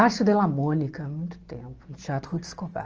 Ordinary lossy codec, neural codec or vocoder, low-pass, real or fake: Opus, 24 kbps; none; 7.2 kHz; real